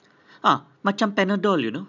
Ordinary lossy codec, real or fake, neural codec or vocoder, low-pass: none; real; none; 7.2 kHz